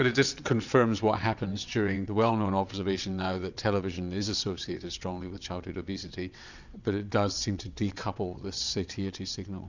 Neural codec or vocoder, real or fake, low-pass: vocoder, 22.05 kHz, 80 mel bands, WaveNeXt; fake; 7.2 kHz